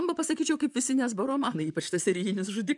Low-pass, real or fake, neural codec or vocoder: 10.8 kHz; fake; vocoder, 44.1 kHz, 128 mel bands, Pupu-Vocoder